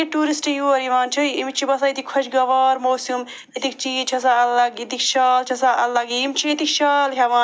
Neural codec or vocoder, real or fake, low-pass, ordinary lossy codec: none; real; none; none